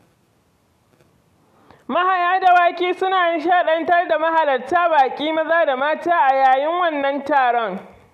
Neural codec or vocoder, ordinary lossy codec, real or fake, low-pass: none; none; real; 14.4 kHz